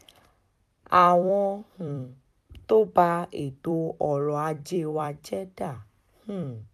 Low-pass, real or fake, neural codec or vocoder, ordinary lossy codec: 14.4 kHz; fake; vocoder, 44.1 kHz, 128 mel bands every 256 samples, BigVGAN v2; AAC, 96 kbps